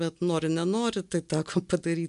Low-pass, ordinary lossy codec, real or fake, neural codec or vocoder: 10.8 kHz; MP3, 96 kbps; real; none